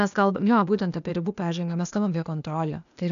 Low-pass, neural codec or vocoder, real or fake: 7.2 kHz; codec, 16 kHz, 0.8 kbps, ZipCodec; fake